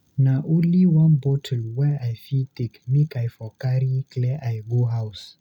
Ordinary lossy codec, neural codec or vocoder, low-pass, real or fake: none; none; 19.8 kHz; real